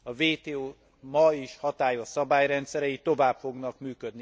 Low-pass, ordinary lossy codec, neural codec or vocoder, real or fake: none; none; none; real